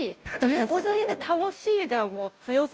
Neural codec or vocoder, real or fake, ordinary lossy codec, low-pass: codec, 16 kHz, 0.5 kbps, FunCodec, trained on Chinese and English, 25 frames a second; fake; none; none